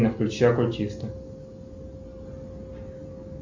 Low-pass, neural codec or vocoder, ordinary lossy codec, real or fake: 7.2 kHz; none; MP3, 48 kbps; real